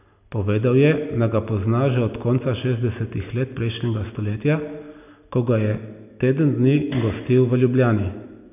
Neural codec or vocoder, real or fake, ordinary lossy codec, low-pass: none; real; none; 3.6 kHz